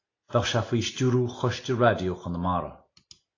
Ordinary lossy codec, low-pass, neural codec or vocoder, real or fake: AAC, 32 kbps; 7.2 kHz; none; real